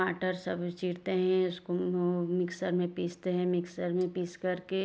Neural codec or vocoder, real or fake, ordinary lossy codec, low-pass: none; real; none; none